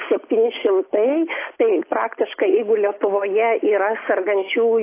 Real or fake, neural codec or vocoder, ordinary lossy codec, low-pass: real; none; AAC, 24 kbps; 3.6 kHz